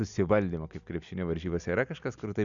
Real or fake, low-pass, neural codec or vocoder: real; 7.2 kHz; none